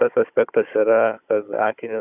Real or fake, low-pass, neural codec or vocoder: fake; 3.6 kHz; codec, 16 kHz, 16 kbps, FunCodec, trained on Chinese and English, 50 frames a second